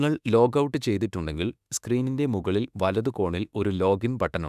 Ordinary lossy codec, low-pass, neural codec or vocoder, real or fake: none; 14.4 kHz; autoencoder, 48 kHz, 32 numbers a frame, DAC-VAE, trained on Japanese speech; fake